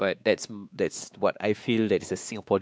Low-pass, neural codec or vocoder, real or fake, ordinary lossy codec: none; codec, 16 kHz, 2 kbps, X-Codec, WavLM features, trained on Multilingual LibriSpeech; fake; none